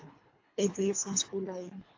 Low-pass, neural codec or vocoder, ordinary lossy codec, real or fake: 7.2 kHz; codec, 24 kHz, 3 kbps, HILCodec; AAC, 48 kbps; fake